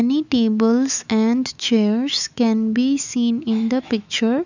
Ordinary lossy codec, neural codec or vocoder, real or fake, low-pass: none; autoencoder, 48 kHz, 128 numbers a frame, DAC-VAE, trained on Japanese speech; fake; 7.2 kHz